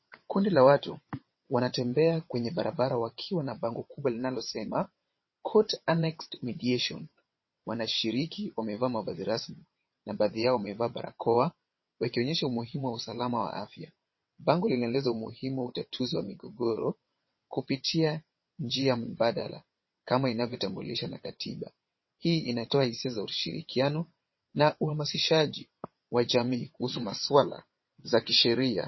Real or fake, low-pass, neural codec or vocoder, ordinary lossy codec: fake; 7.2 kHz; vocoder, 22.05 kHz, 80 mel bands, WaveNeXt; MP3, 24 kbps